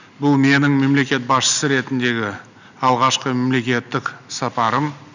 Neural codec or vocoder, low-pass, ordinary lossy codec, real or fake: none; 7.2 kHz; none; real